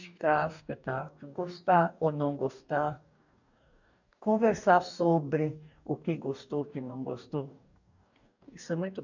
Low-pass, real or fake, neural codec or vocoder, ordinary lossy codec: 7.2 kHz; fake; codec, 44.1 kHz, 2.6 kbps, DAC; none